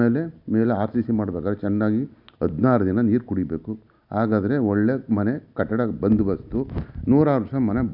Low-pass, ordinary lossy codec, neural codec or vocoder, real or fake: 5.4 kHz; none; none; real